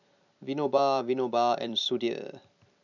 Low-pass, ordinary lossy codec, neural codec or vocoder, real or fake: 7.2 kHz; none; vocoder, 44.1 kHz, 128 mel bands every 256 samples, BigVGAN v2; fake